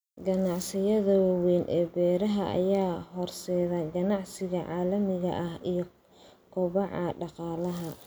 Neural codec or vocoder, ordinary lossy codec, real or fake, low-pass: none; none; real; none